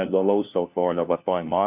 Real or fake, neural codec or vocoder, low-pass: fake; codec, 16 kHz, 1 kbps, FunCodec, trained on LibriTTS, 50 frames a second; 3.6 kHz